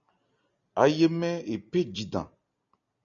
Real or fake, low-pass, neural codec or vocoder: real; 7.2 kHz; none